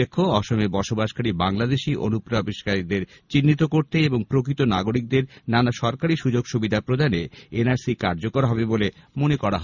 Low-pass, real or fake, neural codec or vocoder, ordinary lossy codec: 7.2 kHz; real; none; none